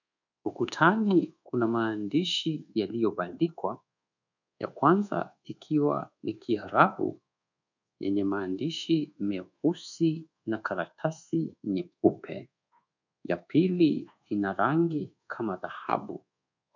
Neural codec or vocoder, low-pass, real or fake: codec, 24 kHz, 1.2 kbps, DualCodec; 7.2 kHz; fake